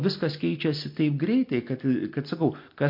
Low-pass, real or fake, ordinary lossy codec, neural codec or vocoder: 5.4 kHz; real; MP3, 32 kbps; none